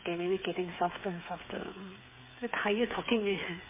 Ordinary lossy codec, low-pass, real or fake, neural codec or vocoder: MP3, 16 kbps; 3.6 kHz; fake; codec, 16 kHz, 8 kbps, FreqCodec, smaller model